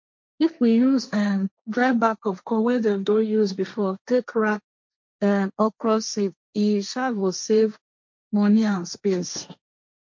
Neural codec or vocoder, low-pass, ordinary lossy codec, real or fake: codec, 16 kHz, 1.1 kbps, Voila-Tokenizer; 7.2 kHz; MP3, 48 kbps; fake